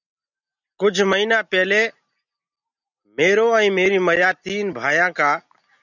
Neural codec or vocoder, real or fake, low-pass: none; real; 7.2 kHz